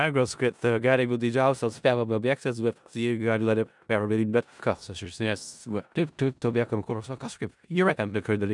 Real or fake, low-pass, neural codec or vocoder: fake; 10.8 kHz; codec, 16 kHz in and 24 kHz out, 0.4 kbps, LongCat-Audio-Codec, four codebook decoder